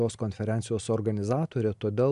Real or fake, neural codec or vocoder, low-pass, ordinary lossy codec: real; none; 10.8 kHz; AAC, 96 kbps